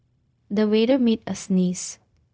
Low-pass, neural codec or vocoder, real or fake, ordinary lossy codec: none; codec, 16 kHz, 0.4 kbps, LongCat-Audio-Codec; fake; none